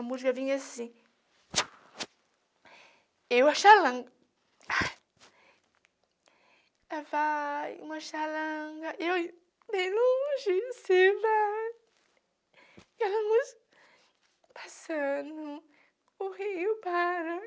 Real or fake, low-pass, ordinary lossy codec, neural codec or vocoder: real; none; none; none